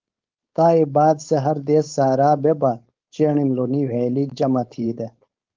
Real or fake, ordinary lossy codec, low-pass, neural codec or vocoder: fake; Opus, 32 kbps; 7.2 kHz; codec, 16 kHz, 4.8 kbps, FACodec